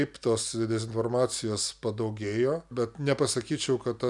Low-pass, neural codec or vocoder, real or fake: 10.8 kHz; none; real